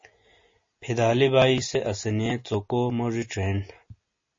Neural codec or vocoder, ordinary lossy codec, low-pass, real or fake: none; MP3, 32 kbps; 7.2 kHz; real